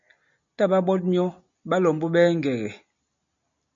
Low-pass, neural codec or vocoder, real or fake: 7.2 kHz; none; real